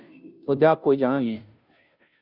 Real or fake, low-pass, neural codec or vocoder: fake; 5.4 kHz; codec, 16 kHz, 0.5 kbps, FunCodec, trained on Chinese and English, 25 frames a second